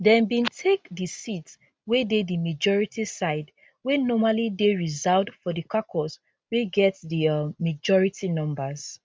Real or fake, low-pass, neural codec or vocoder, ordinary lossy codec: real; none; none; none